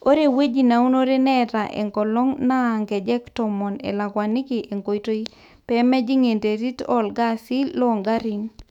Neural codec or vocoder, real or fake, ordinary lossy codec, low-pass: autoencoder, 48 kHz, 128 numbers a frame, DAC-VAE, trained on Japanese speech; fake; none; 19.8 kHz